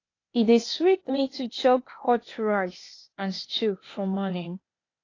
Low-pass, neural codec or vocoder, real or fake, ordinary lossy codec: 7.2 kHz; codec, 16 kHz, 0.8 kbps, ZipCodec; fake; AAC, 32 kbps